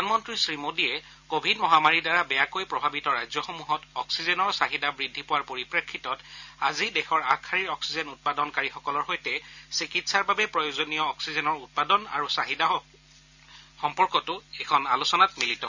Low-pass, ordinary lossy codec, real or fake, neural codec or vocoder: 7.2 kHz; none; real; none